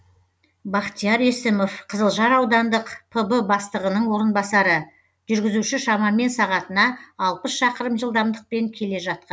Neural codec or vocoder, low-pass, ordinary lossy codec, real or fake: none; none; none; real